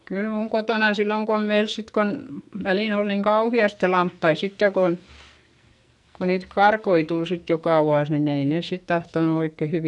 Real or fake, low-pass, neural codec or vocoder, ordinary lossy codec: fake; 10.8 kHz; codec, 44.1 kHz, 2.6 kbps, SNAC; none